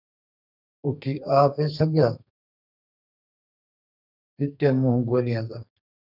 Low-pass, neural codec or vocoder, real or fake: 5.4 kHz; codec, 32 kHz, 1.9 kbps, SNAC; fake